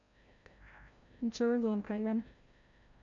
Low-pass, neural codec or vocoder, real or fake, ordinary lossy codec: 7.2 kHz; codec, 16 kHz, 0.5 kbps, FreqCodec, larger model; fake; none